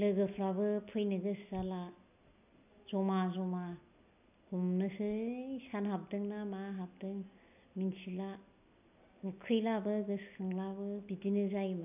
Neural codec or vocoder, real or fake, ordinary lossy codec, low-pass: none; real; none; 3.6 kHz